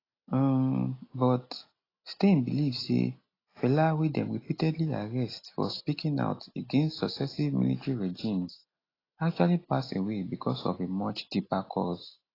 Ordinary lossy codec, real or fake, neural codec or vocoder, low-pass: AAC, 24 kbps; real; none; 5.4 kHz